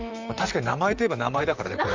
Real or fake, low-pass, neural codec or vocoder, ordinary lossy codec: fake; 7.2 kHz; vocoder, 44.1 kHz, 128 mel bands, Pupu-Vocoder; Opus, 32 kbps